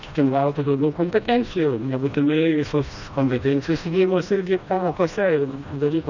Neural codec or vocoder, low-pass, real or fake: codec, 16 kHz, 1 kbps, FreqCodec, smaller model; 7.2 kHz; fake